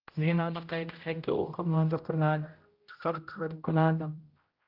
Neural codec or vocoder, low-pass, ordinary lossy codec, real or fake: codec, 16 kHz, 0.5 kbps, X-Codec, HuBERT features, trained on general audio; 5.4 kHz; Opus, 24 kbps; fake